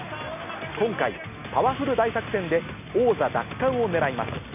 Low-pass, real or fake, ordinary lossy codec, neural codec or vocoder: 3.6 kHz; real; none; none